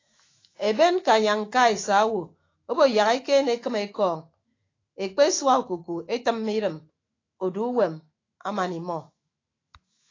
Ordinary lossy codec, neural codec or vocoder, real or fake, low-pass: AAC, 32 kbps; autoencoder, 48 kHz, 128 numbers a frame, DAC-VAE, trained on Japanese speech; fake; 7.2 kHz